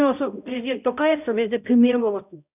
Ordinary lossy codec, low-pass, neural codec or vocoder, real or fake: none; 3.6 kHz; codec, 16 kHz, 0.5 kbps, X-Codec, HuBERT features, trained on balanced general audio; fake